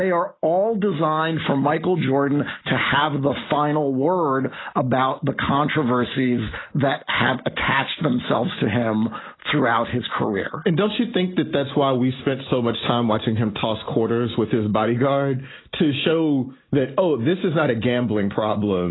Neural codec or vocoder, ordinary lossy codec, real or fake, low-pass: none; AAC, 16 kbps; real; 7.2 kHz